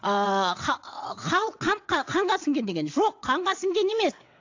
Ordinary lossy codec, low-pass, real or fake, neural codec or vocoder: AAC, 48 kbps; 7.2 kHz; fake; vocoder, 22.05 kHz, 80 mel bands, WaveNeXt